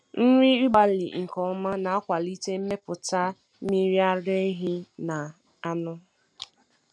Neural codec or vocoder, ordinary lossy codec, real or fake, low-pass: none; none; real; none